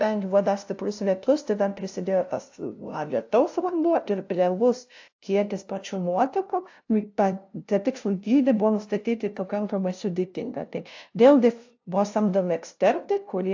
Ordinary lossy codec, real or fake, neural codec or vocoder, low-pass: AAC, 48 kbps; fake; codec, 16 kHz, 0.5 kbps, FunCodec, trained on LibriTTS, 25 frames a second; 7.2 kHz